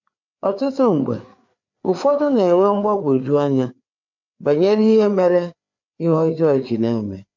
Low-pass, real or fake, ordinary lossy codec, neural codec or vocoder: 7.2 kHz; fake; MP3, 48 kbps; codec, 16 kHz, 4 kbps, FreqCodec, larger model